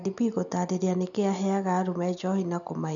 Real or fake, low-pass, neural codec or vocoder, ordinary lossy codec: real; 7.2 kHz; none; none